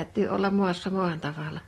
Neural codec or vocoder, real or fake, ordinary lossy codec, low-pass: none; real; AAC, 32 kbps; 19.8 kHz